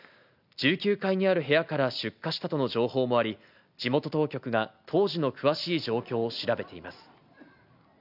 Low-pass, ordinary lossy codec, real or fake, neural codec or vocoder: 5.4 kHz; none; real; none